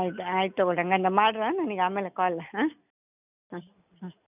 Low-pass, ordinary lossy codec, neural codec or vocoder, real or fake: 3.6 kHz; none; none; real